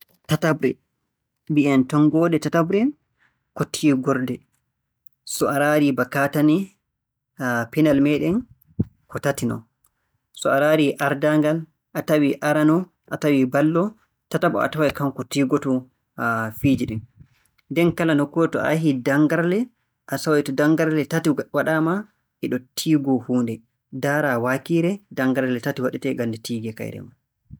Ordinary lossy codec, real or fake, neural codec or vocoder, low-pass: none; real; none; none